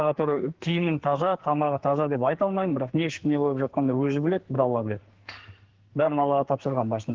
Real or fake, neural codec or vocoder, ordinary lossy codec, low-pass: fake; codec, 44.1 kHz, 2.6 kbps, SNAC; Opus, 16 kbps; 7.2 kHz